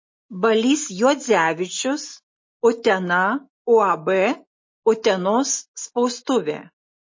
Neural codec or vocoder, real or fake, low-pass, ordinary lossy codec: none; real; 7.2 kHz; MP3, 32 kbps